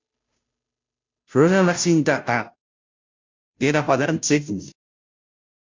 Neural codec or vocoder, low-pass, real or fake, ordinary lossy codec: codec, 16 kHz, 0.5 kbps, FunCodec, trained on Chinese and English, 25 frames a second; 7.2 kHz; fake; MP3, 64 kbps